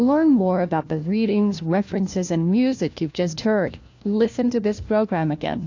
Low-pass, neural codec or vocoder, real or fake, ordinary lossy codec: 7.2 kHz; codec, 16 kHz, 1 kbps, FunCodec, trained on LibriTTS, 50 frames a second; fake; AAC, 48 kbps